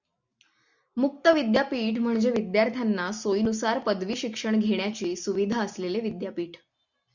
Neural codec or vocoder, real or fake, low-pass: none; real; 7.2 kHz